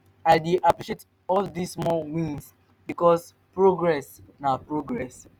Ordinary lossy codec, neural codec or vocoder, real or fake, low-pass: none; none; real; none